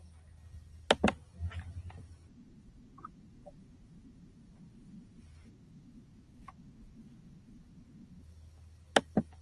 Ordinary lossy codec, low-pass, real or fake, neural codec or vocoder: Opus, 32 kbps; 10.8 kHz; real; none